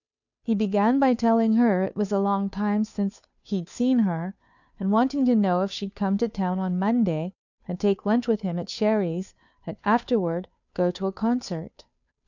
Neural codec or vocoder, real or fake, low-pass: codec, 16 kHz, 2 kbps, FunCodec, trained on Chinese and English, 25 frames a second; fake; 7.2 kHz